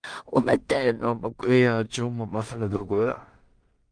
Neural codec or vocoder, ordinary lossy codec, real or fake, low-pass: codec, 16 kHz in and 24 kHz out, 0.4 kbps, LongCat-Audio-Codec, two codebook decoder; Opus, 32 kbps; fake; 9.9 kHz